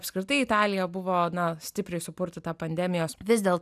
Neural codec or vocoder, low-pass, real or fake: none; 14.4 kHz; real